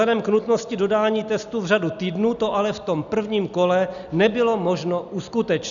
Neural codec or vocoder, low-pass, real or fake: none; 7.2 kHz; real